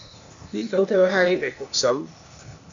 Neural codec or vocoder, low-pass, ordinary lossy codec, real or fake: codec, 16 kHz, 0.8 kbps, ZipCodec; 7.2 kHz; MP3, 64 kbps; fake